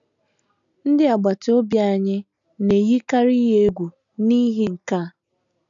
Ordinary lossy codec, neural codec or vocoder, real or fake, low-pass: none; none; real; 7.2 kHz